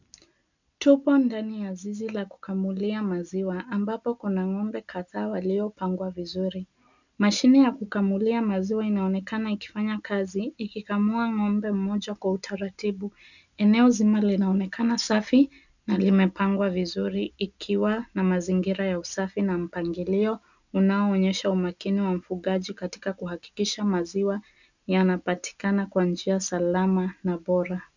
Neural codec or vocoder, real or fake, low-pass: none; real; 7.2 kHz